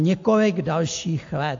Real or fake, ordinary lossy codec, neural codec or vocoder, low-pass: real; AAC, 48 kbps; none; 7.2 kHz